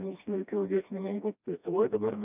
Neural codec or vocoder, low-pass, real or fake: codec, 16 kHz, 1 kbps, FreqCodec, smaller model; 3.6 kHz; fake